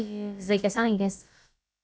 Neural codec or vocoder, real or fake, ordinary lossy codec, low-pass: codec, 16 kHz, about 1 kbps, DyCAST, with the encoder's durations; fake; none; none